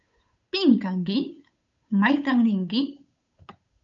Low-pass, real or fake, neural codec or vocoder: 7.2 kHz; fake; codec, 16 kHz, 8 kbps, FunCodec, trained on Chinese and English, 25 frames a second